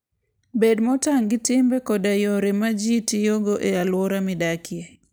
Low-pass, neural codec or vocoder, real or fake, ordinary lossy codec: none; none; real; none